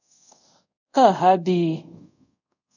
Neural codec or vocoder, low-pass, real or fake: codec, 24 kHz, 0.5 kbps, DualCodec; 7.2 kHz; fake